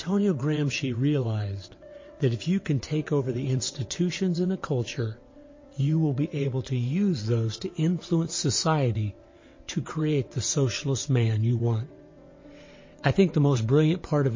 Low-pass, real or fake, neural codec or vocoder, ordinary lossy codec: 7.2 kHz; fake; vocoder, 22.05 kHz, 80 mel bands, WaveNeXt; MP3, 32 kbps